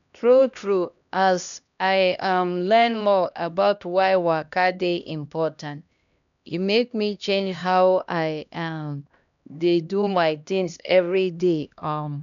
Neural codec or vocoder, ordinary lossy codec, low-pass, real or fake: codec, 16 kHz, 1 kbps, X-Codec, HuBERT features, trained on LibriSpeech; none; 7.2 kHz; fake